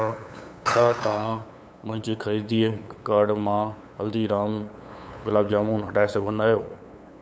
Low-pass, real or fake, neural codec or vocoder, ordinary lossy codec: none; fake; codec, 16 kHz, 8 kbps, FunCodec, trained on LibriTTS, 25 frames a second; none